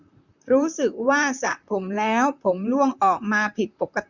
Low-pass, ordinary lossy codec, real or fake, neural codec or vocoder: 7.2 kHz; none; fake; vocoder, 44.1 kHz, 128 mel bands, Pupu-Vocoder